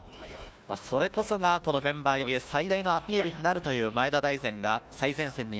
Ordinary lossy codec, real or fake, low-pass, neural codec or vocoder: none; fake; none; codec, 16 kHz, 1 kbps, FunCodec, trained on Chinese and English, 50 frames a second